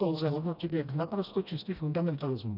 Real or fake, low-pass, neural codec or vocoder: fake; 5.4 kHz; codec, 16 kHz, 1 kbps, FreqCodec, smaller model